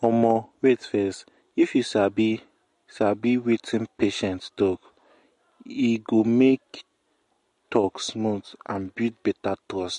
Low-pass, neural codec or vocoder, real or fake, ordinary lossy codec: 14.4 kHz; none; real; MP3, 48 kbps